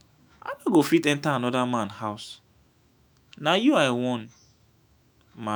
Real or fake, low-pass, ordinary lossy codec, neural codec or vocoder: fake; none; none; autoencoder, 48 kHz, 128 numbers a frame, DAC-VAE, trained on Japanese speech